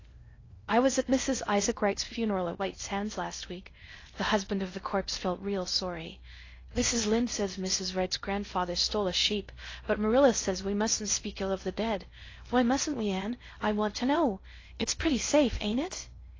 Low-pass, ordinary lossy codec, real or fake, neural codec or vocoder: 7.2 kHz; AAC, 32 kbps; fake; codec, 16 kHz in and 24 kHz out, 0.6 kbps, FocalCodec, streaming, 2048 codes